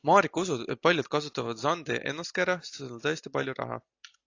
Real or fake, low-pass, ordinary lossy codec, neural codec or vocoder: real; 7.2 kHz; AAC, 48 kbps; none